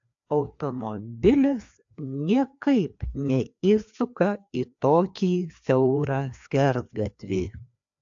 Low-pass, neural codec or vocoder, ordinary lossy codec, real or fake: 7.2 kHz; codec, 16 kHz, 2 kbps, FreqCodec, larger model; AAC, 64 kbps; fake